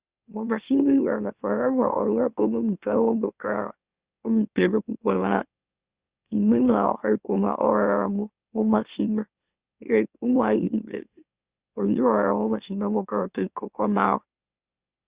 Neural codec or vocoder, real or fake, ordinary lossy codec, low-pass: autoencoder, 44.1 kHz, a latent of 192 numbers a frame, MeloTTS; fake; Opus, 64 kbps; 3.6 kHz